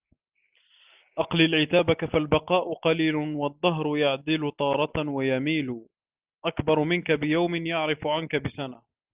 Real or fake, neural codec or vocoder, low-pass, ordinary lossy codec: real; none; 3.6 kHz; Opus, 24 kbps